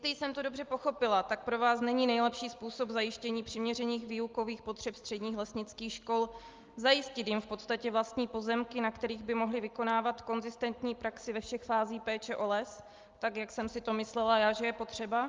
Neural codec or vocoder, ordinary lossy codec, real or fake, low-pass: none; Opus, 32 kbps; real; 7.2 kHz